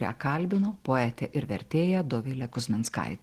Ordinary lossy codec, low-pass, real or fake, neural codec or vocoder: Opus, 16 kbps; 14.4 kHz; real; none